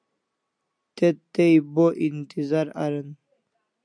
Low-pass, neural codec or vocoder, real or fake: 9.9 kHz; none; real